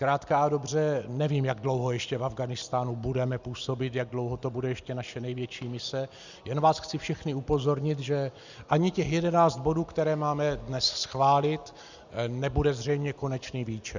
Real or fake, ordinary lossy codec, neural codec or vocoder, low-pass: real; Opus, 64 kbps; none; 7.2 kHz